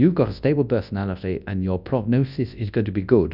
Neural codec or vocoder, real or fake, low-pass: codec, 24 kHz, 0.9 kbps, WavTokenizer, large speech release; fake; 5.4 kHz